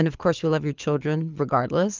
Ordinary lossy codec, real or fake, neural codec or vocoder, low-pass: Opus, 24 kbps; fake; codec, 24 kHz, 6 kbps, HILCodec; 7.2 kHz